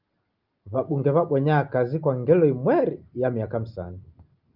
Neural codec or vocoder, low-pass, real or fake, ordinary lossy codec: none; 5.4 kHz; real; Opus, 24 kbps